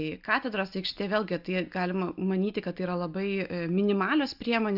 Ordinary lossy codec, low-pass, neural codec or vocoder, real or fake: AAC, 48 kbps; 5.4 kHz; none; real